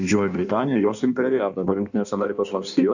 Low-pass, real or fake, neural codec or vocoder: 7.2 kHz; fake; codec, 16 kHz in and 24 kHz out, 1.1 kbps, FireRedTTS-2 codec